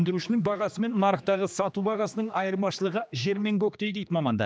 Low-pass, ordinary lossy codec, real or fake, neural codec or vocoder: none; none; fake; codec, 16 kHz, 2 kbps, X-Codec, HuBERT features, trained on general audio